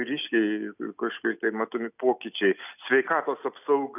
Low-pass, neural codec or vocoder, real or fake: 3.6 kHz; none; real